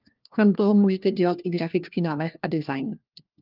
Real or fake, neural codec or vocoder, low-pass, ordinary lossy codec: fake; codec, 16 kHz, 1 kbps, FunCodec, trained on LibriTTS, 50 frames a second; 5.4 kHz; Opus, 32 kbps